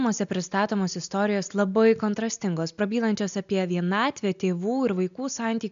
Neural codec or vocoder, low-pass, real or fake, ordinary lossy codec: none; 7.2 kHz; real; AAC, 96 kbps